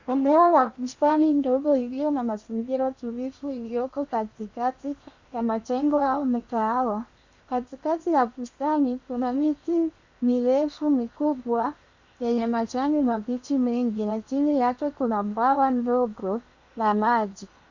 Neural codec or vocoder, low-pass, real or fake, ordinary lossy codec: codec, 16 kHz in and 24 kHz out, 0.8 kbps, FocalCodec, streaming, 65536 codes; 7.2 kHz; fake; Opus, 64 kbps